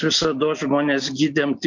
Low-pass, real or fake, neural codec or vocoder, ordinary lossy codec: 7.2 kHz; real; none; MP3, 64 kbps